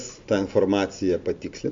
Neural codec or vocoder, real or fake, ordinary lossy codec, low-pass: none; real; MP3, 48 kbps; 7.2 kHz